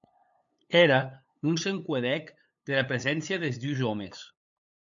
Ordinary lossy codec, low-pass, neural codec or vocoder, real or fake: MP3, 96 kbps; 7.2 kHz; codec, 16 kHz, 8 kbps, FunCodec, trained on LibriTTS, 25 frames a second; fake